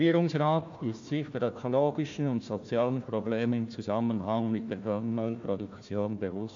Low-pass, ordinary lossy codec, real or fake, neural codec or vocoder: 7.2 kHz; AAC, 48 kbps; fake; codec, 16 kHz, 1 kbps, FunCodec, trained on Chinese and English, 50 frames a second